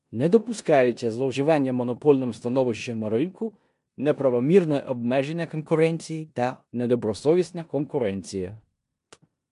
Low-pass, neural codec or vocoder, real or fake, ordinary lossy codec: 10.8 kHz; codec, 16 kHz in and 24 kHz out, 0.9 kbps, LongCat-Audio-Codec, four codebook decoder; fake; MP3, 64 kbps